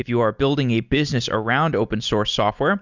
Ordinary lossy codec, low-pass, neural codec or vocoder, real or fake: Opus, 64 kbps; 7.2 kHz; none; real